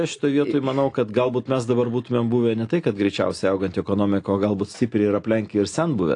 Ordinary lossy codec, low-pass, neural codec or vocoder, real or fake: AAC, 48 kbps; 9.9 kHz; none; real